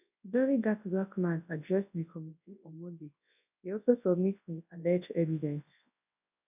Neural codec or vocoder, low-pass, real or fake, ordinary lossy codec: codec, 24 kHz, 0.9 kbps, WavTokenizer, large speech release; 3.6 kHz; fake; none